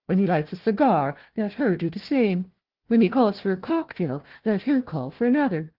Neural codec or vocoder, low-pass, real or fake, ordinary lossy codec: codec, 16 kHz, 1 kbps, FunCodec, trained on Chinese and English, 50 frames a second; 5.4 kHz; fake; Opus, 16 kbps